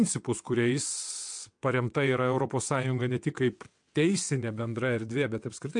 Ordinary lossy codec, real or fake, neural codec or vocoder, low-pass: MP3, 64 kbps; fake; vocoder, 22.05 kHz, 80 mel bands, WaveNeXt; 9.9 kHz